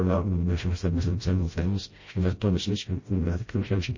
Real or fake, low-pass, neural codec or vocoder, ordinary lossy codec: fake; 7.2 kHz; codec, 16 kHz, 0.5 kbps, FreqCodec, smaller model; MP3, 32 kbps